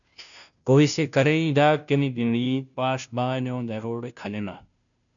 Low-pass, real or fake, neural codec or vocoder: 7.2 kHz; fake; codec, 16 kHz, 0.5 kbps, FunCodec, trained on Chinese and English, 25 frames a second